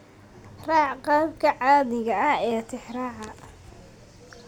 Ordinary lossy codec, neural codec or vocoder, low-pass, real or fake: none; none; 19.8 kHz; real